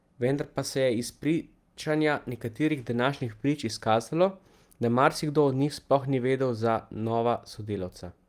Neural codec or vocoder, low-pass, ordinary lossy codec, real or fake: none; 14.4 kHz; Opus, 32 kbps; real